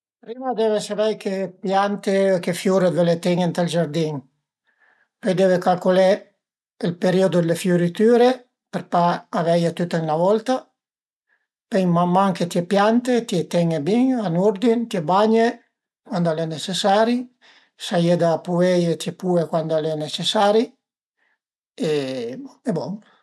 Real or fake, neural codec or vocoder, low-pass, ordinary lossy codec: real; none; none; none